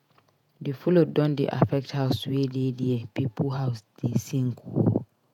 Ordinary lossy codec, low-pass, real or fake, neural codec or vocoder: none; 19.8 kHz; fake; vocoder, 48 kHz, 128 mel bands, Vocos